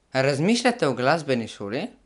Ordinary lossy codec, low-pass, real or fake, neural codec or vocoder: none; 10.8 kHz; real; none